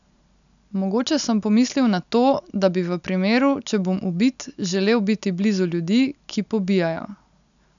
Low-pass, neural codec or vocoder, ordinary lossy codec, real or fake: 7.2 kHz; none; none; real